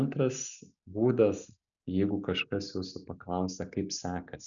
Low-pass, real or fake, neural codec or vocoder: 7.2 kHz; real; none